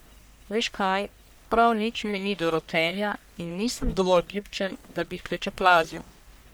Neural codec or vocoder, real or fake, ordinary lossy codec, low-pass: codec, 44.1 kHz, 1.7 kbps, Pupu-Codec; fake; none; none